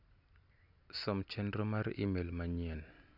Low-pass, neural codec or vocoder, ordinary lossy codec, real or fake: 5.4 kHz; none; none; real